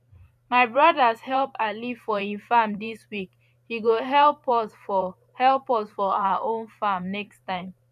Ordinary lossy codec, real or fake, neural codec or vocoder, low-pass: none; fake; vocoder, 44.1 kHz, 128 mel bands every 512 samples, BigVGAN v2; 14.4 kHz